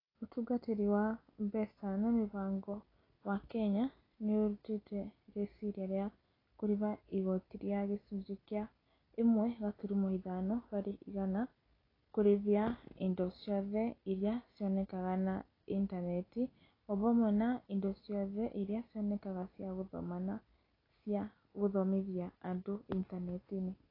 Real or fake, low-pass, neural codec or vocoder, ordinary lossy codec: real; 5.4 kHz; none; AAC, 24 kbps